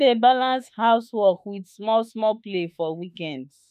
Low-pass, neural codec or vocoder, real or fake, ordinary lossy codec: 14.4 kHz; autoencoder, 48 kHz, 32 numbers a frame, DAC-VAE, trained on Japanese speech; fake; none